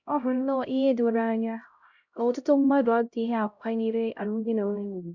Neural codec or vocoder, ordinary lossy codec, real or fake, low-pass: codec, 16 kHz, 0.5 kbps, X-Codec, HuBERT features, trained on LibriSpeech; none; fake; 7.2 kHz